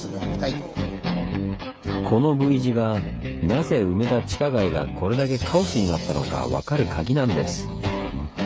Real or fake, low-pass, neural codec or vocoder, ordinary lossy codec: fake; none; codec, 16 kHz, 8 kbps, FreqCodec, smaller model; none